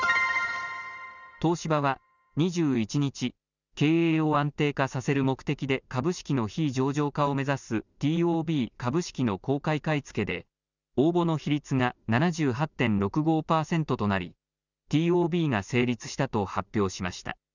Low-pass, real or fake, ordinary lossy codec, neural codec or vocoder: 7.2 kHz; real; none; none